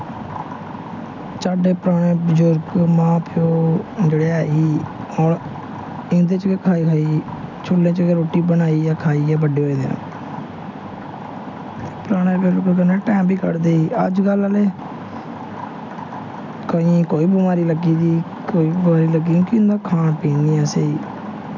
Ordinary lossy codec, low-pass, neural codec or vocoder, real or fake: none; 7.2 kHz; none; real